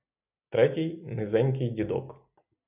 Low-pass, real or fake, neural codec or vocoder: 3.6 kHz; real; none